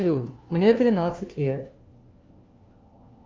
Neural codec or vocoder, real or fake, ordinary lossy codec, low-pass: codec, 16 kHz, 1 kbps, FunCodec, trained on LibriTTS, 50 frames a second; fake; Opus, 32 kbps; 7.2 kHz